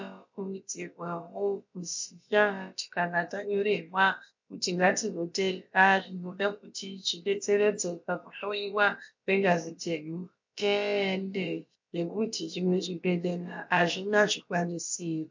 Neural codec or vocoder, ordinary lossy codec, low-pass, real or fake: codec, 16 kHz, about 1 kbps, DyCAST, with the encoder's durations; MP3, 48 kbps; 7.2 kHz; fake